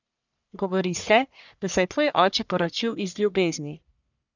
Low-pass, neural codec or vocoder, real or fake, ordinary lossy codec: 7.2 kHz; codec, 44.1 kHz, 1.7 kbps, Pupu-Codec; fake; none